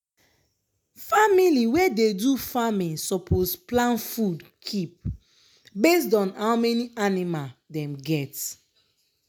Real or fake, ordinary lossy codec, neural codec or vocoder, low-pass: real; none; none; none